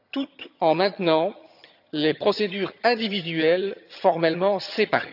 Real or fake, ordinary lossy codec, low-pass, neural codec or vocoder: fake; none; 5.4 kHz; vocoder, 22.05 kHz, 80 mel bands, HiFi-GAN